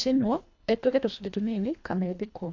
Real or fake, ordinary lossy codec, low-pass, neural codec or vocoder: fake; AAC, 48 kbps; 7.2 kHz; codec, 24 kHz, 1.5 kbps, HILCodec